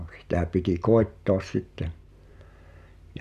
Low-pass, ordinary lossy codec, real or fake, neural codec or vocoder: 14.4 kHz; none; real; none